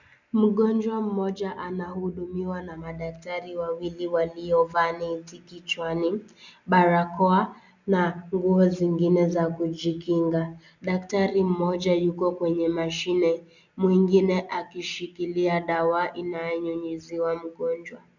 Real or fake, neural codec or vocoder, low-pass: real; none; 7.2 kHz